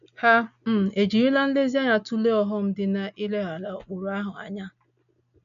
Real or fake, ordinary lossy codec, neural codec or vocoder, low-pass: real; none; none; 7.2 kHz